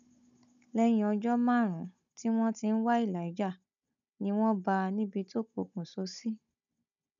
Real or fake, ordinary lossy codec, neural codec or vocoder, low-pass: fake; none; codec, 16 kHz, 16 kbps, FunCodec, trained on Chinese and English, 50 frames a second; 7.2 kHz